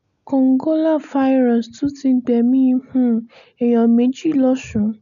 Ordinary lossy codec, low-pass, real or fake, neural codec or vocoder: none; 7.2 kHz; real; none